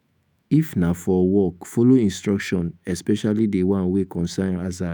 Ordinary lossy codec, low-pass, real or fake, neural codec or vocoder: none; none; fake; autoencoder, 48 kHz, 128 numbers a frame, DAC-VAE, trained on Japanese speech